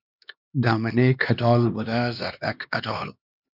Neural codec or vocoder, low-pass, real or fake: codec, 16 kHz, 2 kbps, X-Codec, HuBERT features, trained on LibriSpeech; 5.4 kHz; fake